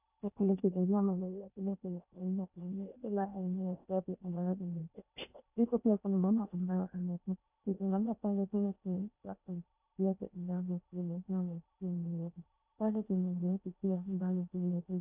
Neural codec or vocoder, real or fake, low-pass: codec, 16 kHz in and 24 kHz out, 0.8 kbps, FocalCodec, streaming, 65536 codes; fake; 3.6 kHz